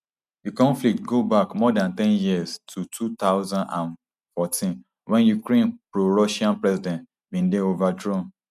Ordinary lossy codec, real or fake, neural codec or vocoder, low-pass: none; real; none; 14.4 kHz